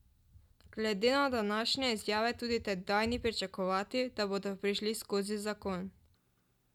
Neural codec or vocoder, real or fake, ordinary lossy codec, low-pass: none; real; none; 19.8 kHz